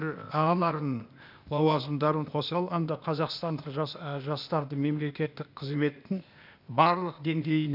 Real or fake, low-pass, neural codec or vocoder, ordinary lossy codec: fake; 5.4 kHz; codec, 16 kHz, 0.8 kbps, ZipCodec; none